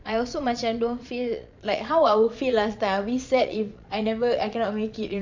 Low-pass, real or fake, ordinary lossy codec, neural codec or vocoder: 7.2 kHz; fake; MP3, 64 kbps; vocoder, 22.05 kHz, 80 mel bands, Vocos